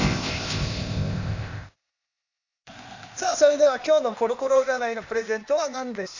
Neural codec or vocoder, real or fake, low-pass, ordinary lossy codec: codec, 16 kHz, 0.8 kbps, ZipCodec; fake; 7.2 kHz; none